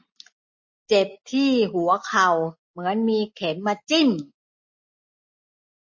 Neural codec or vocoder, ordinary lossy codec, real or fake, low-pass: none; MP3, 32 kbps; real; 7.2 kHz